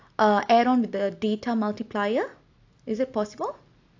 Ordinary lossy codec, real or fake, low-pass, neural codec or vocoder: AAC, 48 kbps; real; 7.2 kHz; none